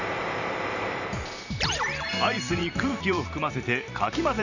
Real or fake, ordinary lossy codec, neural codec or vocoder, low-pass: fake; none; vocoder, 44.1 kHz, 128 mel bands every 512 samples, BigVGAN v2; 7.2 kHz